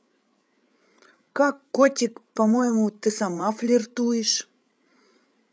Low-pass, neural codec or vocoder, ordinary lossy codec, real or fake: none; codec, 16 kHz, 8 kbps, FreqCodec, larger model; none; fake